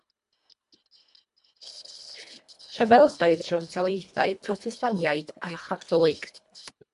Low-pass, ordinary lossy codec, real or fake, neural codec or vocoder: 10.8 kHz; MP3, 64 kbps; fake; codec, 24 kHz, 1.5 kbps, HILCodec